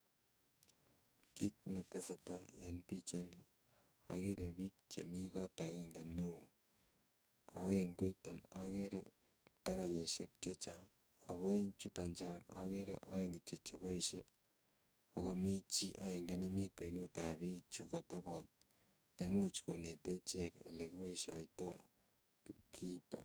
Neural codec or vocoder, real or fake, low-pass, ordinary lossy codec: codec, 44.1 kHz, 2.6 kbps, DAC; fake; none; none